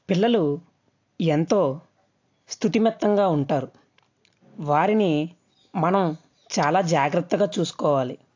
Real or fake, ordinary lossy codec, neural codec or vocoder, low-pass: real; AAC, 48 kbps; none; 7.2 kHz